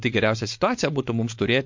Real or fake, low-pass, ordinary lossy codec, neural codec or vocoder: fake; 7.2 kHz; MP3, 48 kbps; codec, 16 kHz, 4.8 kbps, FACodec